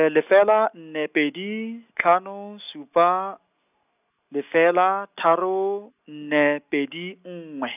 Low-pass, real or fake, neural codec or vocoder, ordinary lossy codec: 3.6 kHz; real; none; none